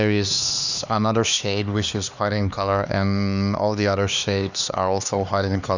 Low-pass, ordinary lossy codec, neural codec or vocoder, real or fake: 7.2 kHz; none; codec, 16 kHz, 2 kbps, X-Codec, HuBERT features, trained on LibriSpeech; fake